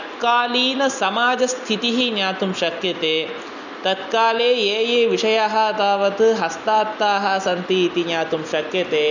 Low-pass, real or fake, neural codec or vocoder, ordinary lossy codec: 7.2 kHz; real; none; none